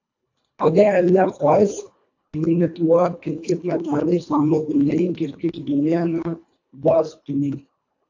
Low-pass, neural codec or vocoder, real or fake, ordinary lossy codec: 7.2 kHz; codec, 24 kHz, 1.5 kbps, HILCodec; fake; AAC, 48 kbps